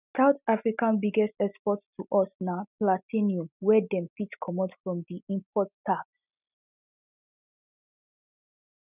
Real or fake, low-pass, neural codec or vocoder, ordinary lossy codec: real; 3.6 kHz; none; none